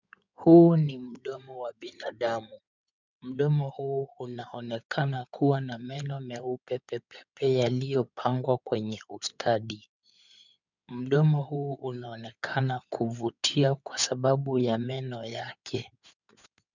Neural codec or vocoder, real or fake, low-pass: codec, 16 kHz in and 24 kHz out, 2.2 kbps, FireRedTTS-2 codec; fake; 7.2 kHz